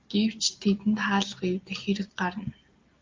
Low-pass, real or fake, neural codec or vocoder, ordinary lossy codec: 7.2 kHz; real; none; Opus, 32 kbps